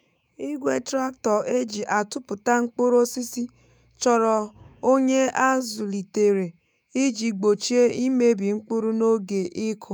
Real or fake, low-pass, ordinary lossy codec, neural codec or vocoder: fake; none; none; autoencoder, 48 kHz, 128 numbers a frame, DAC-VAE, trained on Japanese speech